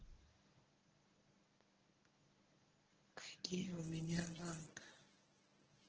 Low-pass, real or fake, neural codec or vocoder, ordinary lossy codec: 7.2 kHz; fake; codec, 24 kHz, 0.9 kbps, WavTokenizer, medium speech release version 1; Opus, 16 kbps